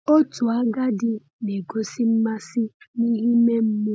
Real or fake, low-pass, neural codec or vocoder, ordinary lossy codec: real; none; none; none